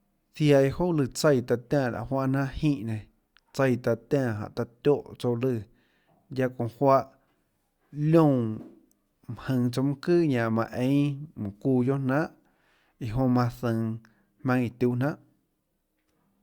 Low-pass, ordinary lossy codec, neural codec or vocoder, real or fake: 19.8 kHz; Opus, 64 kbps; none; real